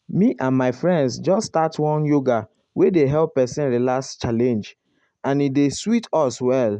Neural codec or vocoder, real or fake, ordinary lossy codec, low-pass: none; real; none; 10.8 kHz